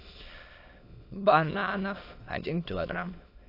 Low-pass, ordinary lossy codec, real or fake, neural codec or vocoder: 5.4 kHz; AAC, 32 kbps; fake; autoencoder, 22.05 kHz, a latent of 192 numbers a frame, VITS, trained on many speakers